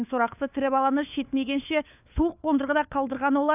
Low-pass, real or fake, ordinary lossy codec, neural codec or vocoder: 3.6 kHz; fake; none; codec, 16 kHz, 4 kbps, FunCodec, trained on LibriTTS, 50 frames a second